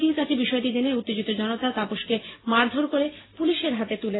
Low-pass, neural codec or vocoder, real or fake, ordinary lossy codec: 7.2 kHz; none; real; AAC, 16 kbps